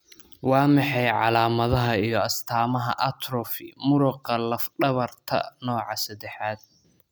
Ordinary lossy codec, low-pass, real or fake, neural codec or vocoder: none; none; real; none